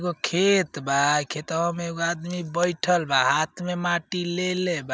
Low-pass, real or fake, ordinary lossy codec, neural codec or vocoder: none; real; none; none